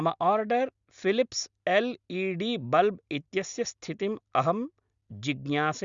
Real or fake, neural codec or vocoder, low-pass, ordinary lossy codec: real; none; 7.2 kHz; Opus, 64 kbps